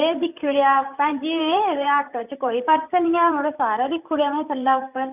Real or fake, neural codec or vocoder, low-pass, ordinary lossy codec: fake; vocoder, 44.1 kHz, 128 mel bands, Pupu-Vocoder; 3.6 kHz; none